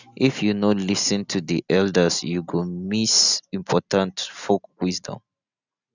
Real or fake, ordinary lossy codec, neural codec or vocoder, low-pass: real; none; none; 7.2 kHz